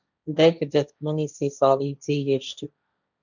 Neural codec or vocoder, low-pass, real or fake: codec, 16 kHz, 1.1 kbps, Voila-Tokenizer; 7.2 kHz; fake